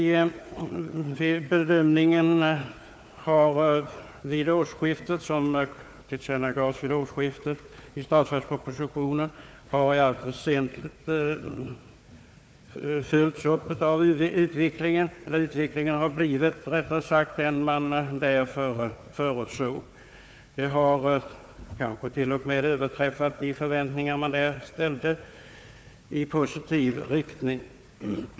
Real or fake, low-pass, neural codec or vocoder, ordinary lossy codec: fake; none; codec, 16 kHz, 4 kbps, FunCodec, trained on Chinese and English, 50 frames a second; none